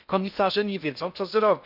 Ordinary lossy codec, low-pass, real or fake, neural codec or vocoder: none; 5.4 kHz; fake; codec, 16 kHz in and 24 kHz out, 0.6 kbps, FocalCodec, streaming, 2048 codes